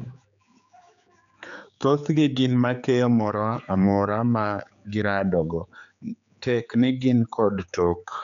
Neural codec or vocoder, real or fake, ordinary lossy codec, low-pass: codec, 16 kHz, 4 kbps, X-Codec, HuBERT features, trained on general audio; fake; none; 7.2 kHz